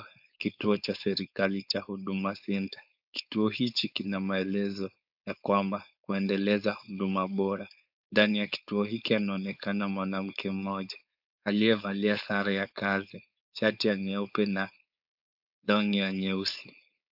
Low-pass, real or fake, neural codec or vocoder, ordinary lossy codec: 5.4 kHz; fake; codec, 16 kHz, 4.8 kbps, FACodec; AAC, 48 kbps